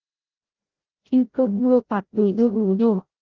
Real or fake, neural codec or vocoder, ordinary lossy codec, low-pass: fake; codec, 16 kHz, 0.5 kbps, FreqCodec, larger model; Opus, 16 kbps; 7.2 kHz